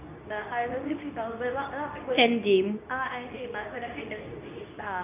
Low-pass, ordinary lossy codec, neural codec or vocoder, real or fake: 3.6 kHz; none; codec, 24 kHz, 0.9 kbps, WavTokenizer, medium speech release version 2; fake